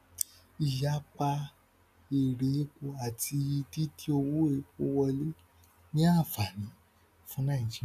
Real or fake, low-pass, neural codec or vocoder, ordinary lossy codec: real; 14.4 kHz; none; none